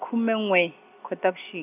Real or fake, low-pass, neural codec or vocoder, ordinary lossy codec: real; 3.6 kHz; none; none